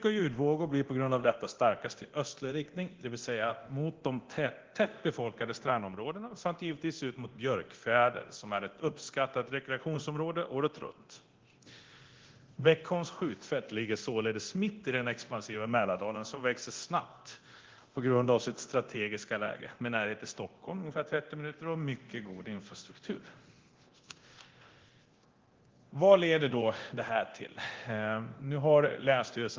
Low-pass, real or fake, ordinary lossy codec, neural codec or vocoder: 7.2 kHz; fake; Opus, 16 kbps; codec, 24 kHz, 0.9 kbps, DualCodec